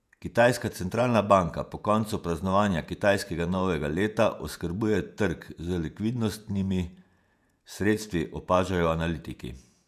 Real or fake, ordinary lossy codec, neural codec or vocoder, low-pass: fake; none; vocoder, 48 kHz, 128 mel bands, Vocos; 14.4 kHz